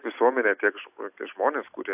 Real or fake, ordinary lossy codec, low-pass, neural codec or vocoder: real; AAC, 32 kbps; 3.6 kHz; none